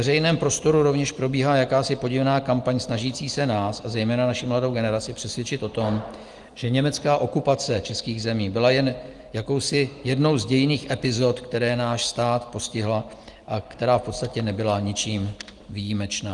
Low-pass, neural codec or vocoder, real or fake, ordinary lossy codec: 10.8 kHz; none; real; Opus, 24 kbps